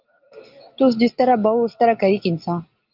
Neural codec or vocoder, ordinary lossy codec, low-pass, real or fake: none; Opus, 24 kbps; 5.4 kHz; real